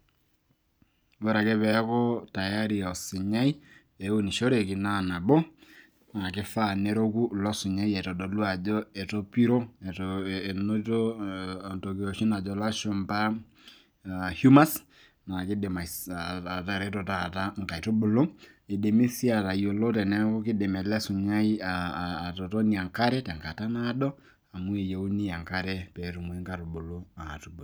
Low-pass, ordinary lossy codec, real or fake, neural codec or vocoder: none; none; real; none